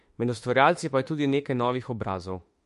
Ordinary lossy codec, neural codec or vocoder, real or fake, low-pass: MP3, 48 kbps; autoencoder, 48 kHz, 32 numbers a frame, DAC-VAE, trained on Japanese speech; fake; 14.4 kHz